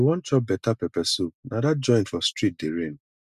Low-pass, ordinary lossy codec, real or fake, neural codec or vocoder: 14.4 kHz; none; real; none